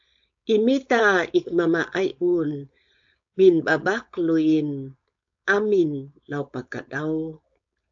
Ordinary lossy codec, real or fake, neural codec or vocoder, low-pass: AAC, 64 kbps; fake; codec, 16 kHz, 4.8 kbps, FACodec; 7.2 kHz